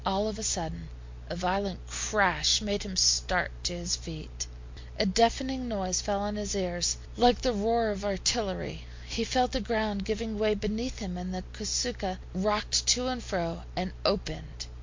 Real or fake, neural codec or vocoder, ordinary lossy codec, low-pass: real; none; MP3, 48 kbps; 7.2 kHz